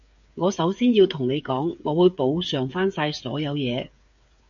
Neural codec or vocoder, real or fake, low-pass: codec, 16 kHz, 8 kbps, FreqCodec, smaller model; fake; 7.2 kHz